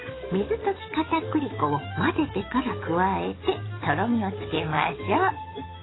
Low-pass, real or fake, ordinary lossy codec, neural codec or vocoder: 7.2 kHz; fake; AAC, 16 kbps; codec, 44.1 kHz, 7.8 kbps, DAC